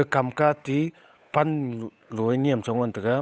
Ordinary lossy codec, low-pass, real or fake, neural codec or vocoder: none; none; fake; codec, 16 kHz, 8 kbps, FunCodec, trained on Chinese and English, 25 frames a second